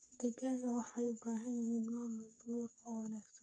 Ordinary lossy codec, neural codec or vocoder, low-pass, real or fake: MP3, 96 kbps; codec, 44.1 kHz, 3.4 kbps, Pupu-Codec; 9.9 kHz; fake